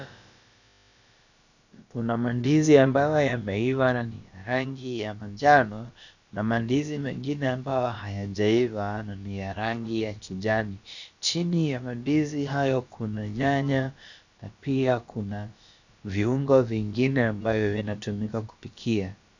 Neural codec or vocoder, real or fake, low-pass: codec, 16 kHz, about 1 kbps, DyCAST, with the encoder's durations; fake; 7.2 kHz